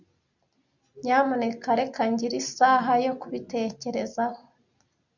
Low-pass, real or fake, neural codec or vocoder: 7.2 kHz; real; none